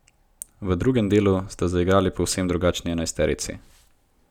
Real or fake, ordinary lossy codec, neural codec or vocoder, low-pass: real; none; none; 19.8 kHz